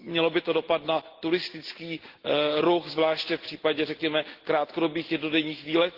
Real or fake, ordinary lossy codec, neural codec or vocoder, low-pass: real; Opus, 16 kbps; none; 5.4 kHz